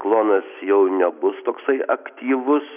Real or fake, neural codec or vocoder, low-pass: real; none; 3.6 kHz